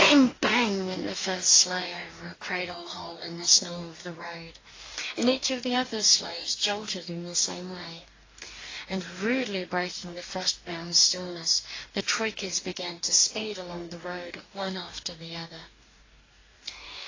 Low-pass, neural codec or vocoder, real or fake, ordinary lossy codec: 7.2 kHz; codec, 44.1 kHz, 2.6 kbps, DAC; fake; AAC, 32 kbps